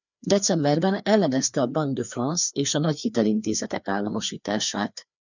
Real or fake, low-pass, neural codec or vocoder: fake; 7.2 kHz; codec, 16 kHz, 2 kbps, FreqCodec, larger model